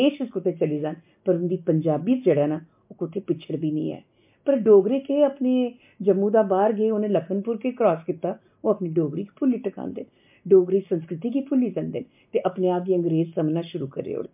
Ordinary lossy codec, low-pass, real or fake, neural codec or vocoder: MP3, 32 kbps; 3.6 kHz; real; none